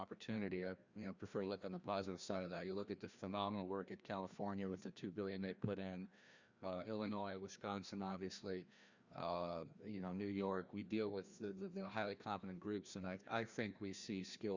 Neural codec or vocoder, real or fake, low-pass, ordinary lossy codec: codec, 16 kHz, 1 kbps, FreqCodec, larger model; fake; 7.2 kHz; Opus, 64 kbps